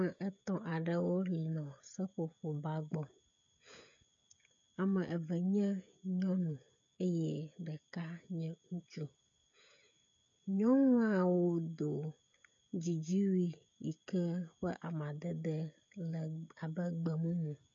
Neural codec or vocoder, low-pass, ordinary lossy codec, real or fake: codec, 16 kHz, 16 kbps, FreqCodec, smaller model; 7.2 kHz; MP3, 48 kbps; fake